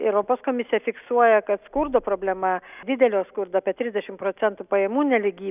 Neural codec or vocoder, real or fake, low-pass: none; real; 3.6 kHz